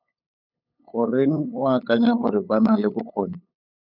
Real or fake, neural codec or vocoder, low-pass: fake; codec, 16 kHz, 8 kbps, FunCodec, trained on LibriTTS, 25 frames a second; 5.4 kHz